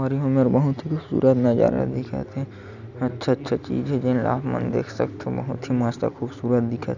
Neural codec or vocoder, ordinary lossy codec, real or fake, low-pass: none; none; real; 7.2 kHz